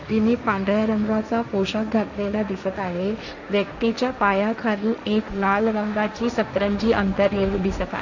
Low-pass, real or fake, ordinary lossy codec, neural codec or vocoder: 7.2 kHz; fake; none; codec, 16 kHz, 1.1 kbps, Voila-Tokenizer